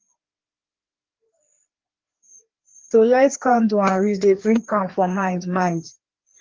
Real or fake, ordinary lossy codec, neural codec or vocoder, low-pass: fake; Opus, 16 kbps; codec, 16 kHz, 2 kbps, FreqCodec, larger model; 7.2 kHz